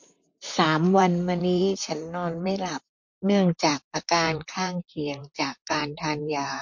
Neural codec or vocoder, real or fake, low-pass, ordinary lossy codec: vocoder, 44.1 kHz, 128 mel bands, Pupu-Vocoder; fake; 7.2 kHz; MP3, 48 kbps